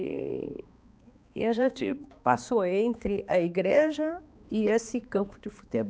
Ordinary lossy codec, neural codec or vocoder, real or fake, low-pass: none; codec, 16 kHz, 2 kbps, X-Codec, HuBERT features, trained on balanced general audio; fake; none